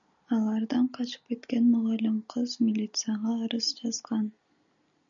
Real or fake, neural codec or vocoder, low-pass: real; none; 7.2 kHz